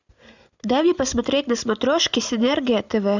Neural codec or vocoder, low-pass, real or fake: none; 7.2 kHz; real